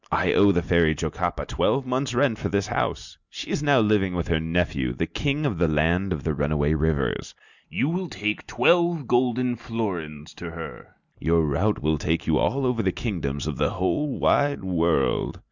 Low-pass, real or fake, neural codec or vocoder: 7.2 kHz; real; none